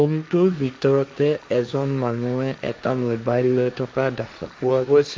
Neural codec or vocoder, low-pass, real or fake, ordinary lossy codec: codec, 16 kHz, 1.1 kbps, Voila-Tokenizer; none; fake; none